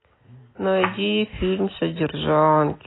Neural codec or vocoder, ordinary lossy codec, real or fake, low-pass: none; AAC, 16 kbps; real; 7.2 kHz